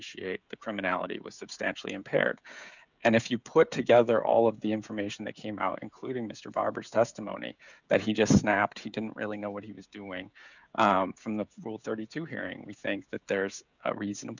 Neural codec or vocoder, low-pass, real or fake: codec, 16 kHz, 16 kbps, FreqCodec, smaller model; 7.2 kHz; fake